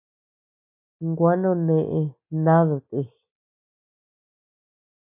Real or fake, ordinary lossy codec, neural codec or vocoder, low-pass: real; MP3, 32 kbps; none; 3.6 kHz